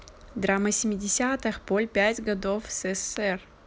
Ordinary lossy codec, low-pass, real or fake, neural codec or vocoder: none; none; real; none